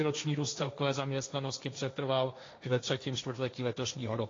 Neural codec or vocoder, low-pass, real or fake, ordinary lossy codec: codec, 16 kHz, 1.1 kbps, Voila-Tokenizer; 7.2 kHz; fake; AAC, 32 kbps